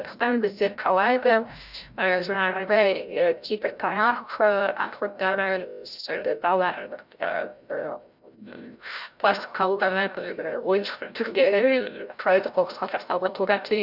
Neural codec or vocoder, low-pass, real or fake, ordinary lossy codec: codec, 16 kHz, 0.5 kbps, FreqCodec, larger model; 5.4 kHz; fake; none